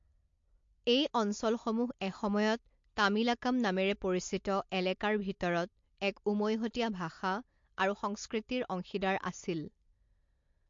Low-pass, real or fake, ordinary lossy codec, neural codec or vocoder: 7.2 kHz; real; MP3, 48 kbps; none